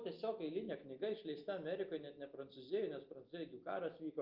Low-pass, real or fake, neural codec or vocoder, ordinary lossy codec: 5.4 kHz; real; none; Opus, 24 kbps